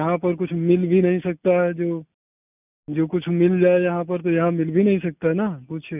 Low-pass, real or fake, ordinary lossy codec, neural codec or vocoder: 3.6 kHz; real; none; none